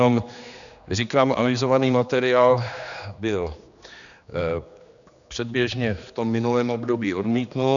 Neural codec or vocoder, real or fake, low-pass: codec, 16 kHz, 2 kbps, X-Codec, HuBERT features, trained on general audio; fake; 7.2 kHz